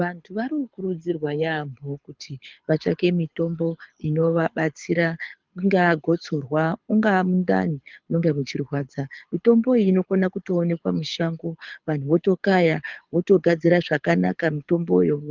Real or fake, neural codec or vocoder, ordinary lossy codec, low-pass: fake; codec, 24 kHz, 6 kbps, HILCodec; Opus, 24 kbps; 7.2 kHz